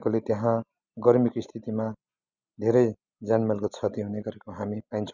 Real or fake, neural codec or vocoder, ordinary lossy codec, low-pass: real; none; none; 7.2 kHz